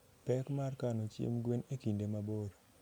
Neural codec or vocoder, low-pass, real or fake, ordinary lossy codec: none; none; real; none